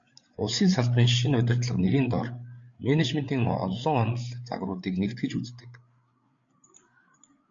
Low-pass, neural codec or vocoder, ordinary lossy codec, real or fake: 7.2 kHz; codec, 16 kHz, 8 kbps, FreqCodec, larger model; MP3, 48 kbps; fake